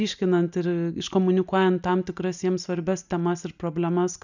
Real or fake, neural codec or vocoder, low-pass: real; none; 7.2 kHz